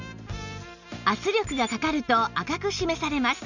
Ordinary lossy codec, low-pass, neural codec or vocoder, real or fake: none; 7.2 kHz; none; real